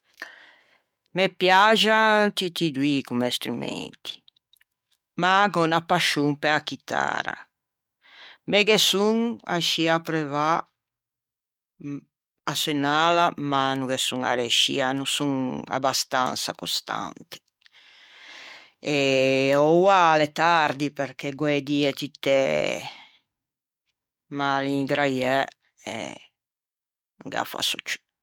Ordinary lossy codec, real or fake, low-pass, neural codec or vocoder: MP3, 96 kbps; fake; 19.8 kHz; codec, 44.1 kHz, 7.8 kbps, DAC